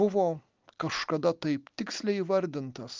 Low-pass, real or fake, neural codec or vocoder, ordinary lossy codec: 7.2 kHz; real; none; Opus, 32 kbps